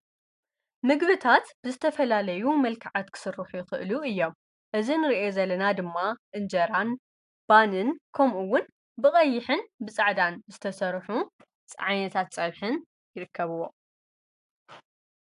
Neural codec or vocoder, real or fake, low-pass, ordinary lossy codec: none; real; 10.8 kHz; AAC, 96 kbps